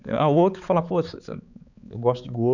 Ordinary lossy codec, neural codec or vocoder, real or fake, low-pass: none; codec, 16 kHz, 4 kbps, X-Codec, HuBERT features, trained on balanced general audio; fake; 7.2 kHz